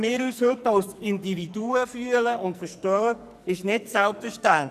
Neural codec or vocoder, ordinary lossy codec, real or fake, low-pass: codec, 44.1 kHz, 2.6 kbps, SNAC; none; fake; 14.4 kHz